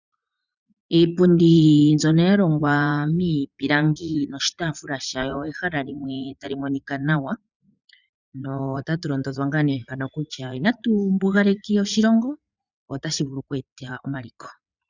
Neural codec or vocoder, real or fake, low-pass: vocoder, 44.1 kHz, 80 mel bands, Vocos; fake; 7.2 kHz